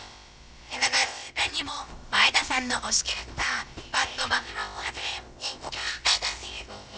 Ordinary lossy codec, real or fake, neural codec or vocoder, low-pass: none; fake; codec, 16 kHz, about 1 kbps, DyCAST, with the encoder's durations; none